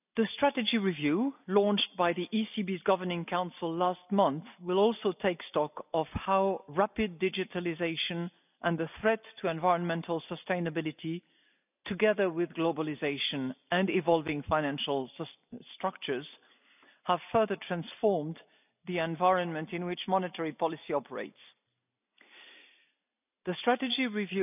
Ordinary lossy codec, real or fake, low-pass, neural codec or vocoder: none; real; 3.6 kHz; none